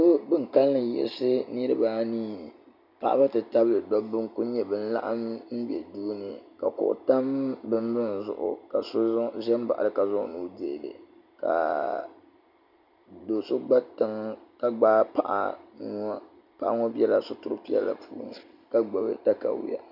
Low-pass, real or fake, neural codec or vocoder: 5.4 kHz; real; none